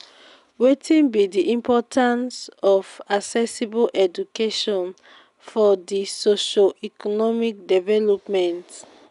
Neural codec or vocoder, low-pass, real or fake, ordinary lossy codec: none; 10.8 kHz; real; none